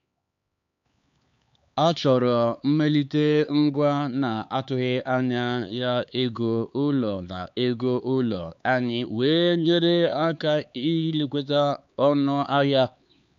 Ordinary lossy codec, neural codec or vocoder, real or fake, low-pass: MP3, 64 kbps; codec, 16 kHz, 4 kbps, X-Codec, HuBERT features, trained on LibriSpeech; fake; 7.2 kHz